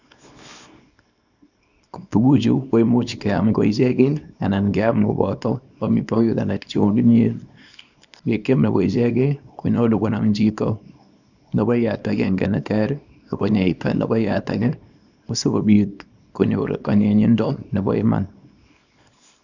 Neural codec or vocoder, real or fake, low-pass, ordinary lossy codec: codec, 24 kHz, 0.9 kbps, WavTokenizer, small release; fake; 7.2 kHz; none